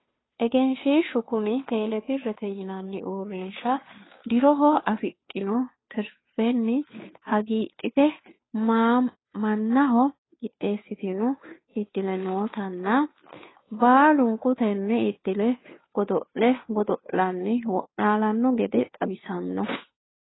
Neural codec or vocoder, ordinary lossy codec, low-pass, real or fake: codec, 16 kHz, 2 kbps, FunCodec, trained on Chinese and English, 25 frames a second; AAC, 16 kbps; 7.2 kHz; fake